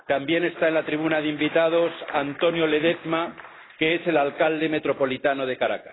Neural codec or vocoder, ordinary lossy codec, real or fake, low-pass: none; AAC, 16 kbps; real; 7.2 kHz